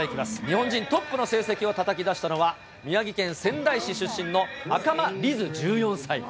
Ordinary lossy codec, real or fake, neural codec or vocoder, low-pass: none; real; none; none